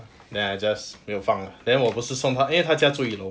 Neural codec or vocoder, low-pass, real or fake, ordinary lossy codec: none; none; real; none